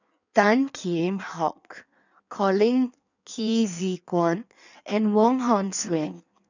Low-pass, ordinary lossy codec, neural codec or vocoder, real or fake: 7.2 kHz; none; codec, 16 kHz in and 24 kHz out, 1.1 kbps, FireRedTTS-2 codec; fake